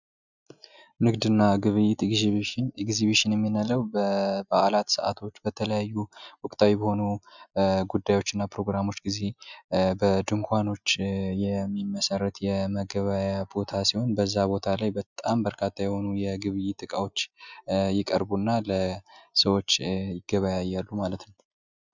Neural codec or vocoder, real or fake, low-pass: none; real; 7.2 kHz